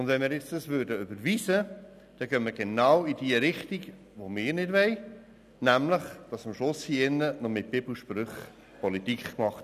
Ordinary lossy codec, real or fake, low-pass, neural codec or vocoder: none; real; 14.4 kHz; none